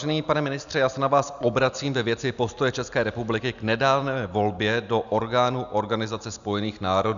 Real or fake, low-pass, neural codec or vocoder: real; 7.2 kHz; none